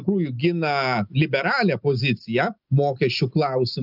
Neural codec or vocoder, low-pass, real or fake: none; 5.4 kHz; real